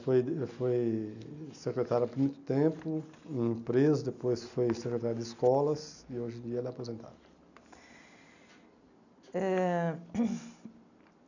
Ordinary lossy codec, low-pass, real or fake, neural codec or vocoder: none; 7.2 kHz; real; none